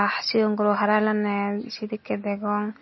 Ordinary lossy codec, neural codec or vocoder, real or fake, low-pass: MP3, 24 kbps; none; real; 7.2 kHz